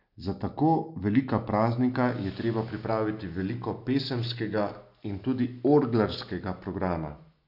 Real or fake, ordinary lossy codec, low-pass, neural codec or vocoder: real; none; 5.4 kHz; none